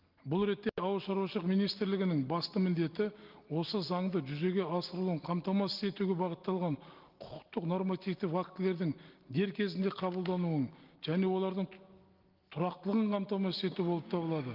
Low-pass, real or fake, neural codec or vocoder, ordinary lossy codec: 5.4 kHz; real; none; Opus, 32 kbps